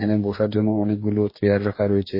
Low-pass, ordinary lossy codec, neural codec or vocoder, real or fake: 5.4 kHz; MP3, 24 kbps; codec, 44.1 kHz, 2.6 kbps, DAC; fake